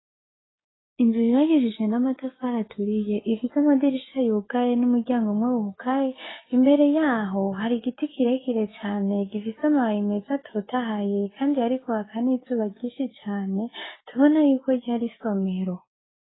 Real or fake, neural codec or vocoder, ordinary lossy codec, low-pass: fake; codec, 16 kHz, 6 kbps, DAC; AAC, 16 kbps; 7.2 kHz